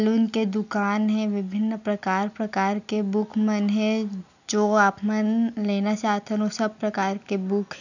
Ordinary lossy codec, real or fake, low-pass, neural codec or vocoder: none; real; 7.2 kHz; none